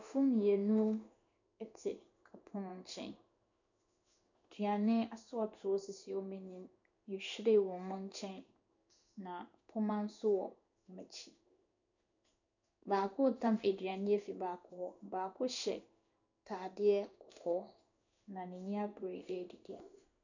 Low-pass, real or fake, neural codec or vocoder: 7.2 kHz; fake; codec, 16 kHz in and 24 kHz out, 1 kbps, XY-Tokenizer